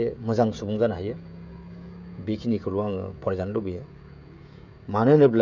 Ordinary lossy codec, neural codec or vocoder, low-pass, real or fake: none; codec, 16 kHz, 16 kbps, FreqCodec, smaller model; 7.2 kHz; fake